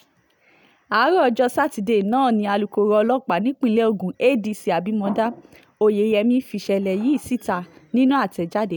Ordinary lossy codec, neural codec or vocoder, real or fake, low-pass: none; none; real; none